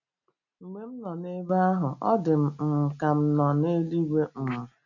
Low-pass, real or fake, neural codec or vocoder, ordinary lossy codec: 7.2 kHz; real; none; none